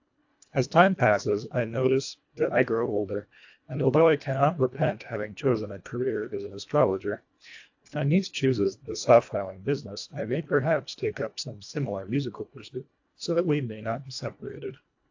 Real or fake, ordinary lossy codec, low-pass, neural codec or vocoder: fake; AAC, 48 kbps; 7.2 kHz; codec, 24 kHz, 1.5 kbps, HILCodec